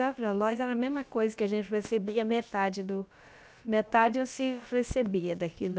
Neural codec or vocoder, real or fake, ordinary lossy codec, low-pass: codec, 16 kHz, about 1 kbps, DyCAST, with the encoder's durations; fake; none; none